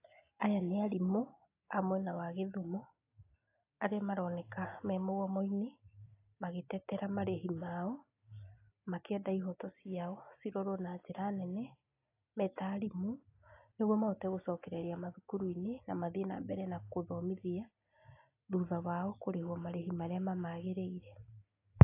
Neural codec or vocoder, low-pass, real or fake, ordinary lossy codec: none; 3.6 kHz; real; AAC, 24 kbps